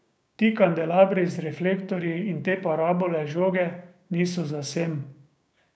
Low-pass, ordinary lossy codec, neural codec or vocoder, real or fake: none; none; codec, 16 kHz, 6 kbps, DAC; fake